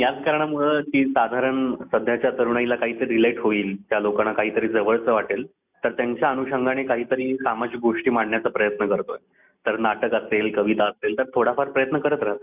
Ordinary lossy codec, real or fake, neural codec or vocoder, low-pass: none; real; none; 3.6 kHz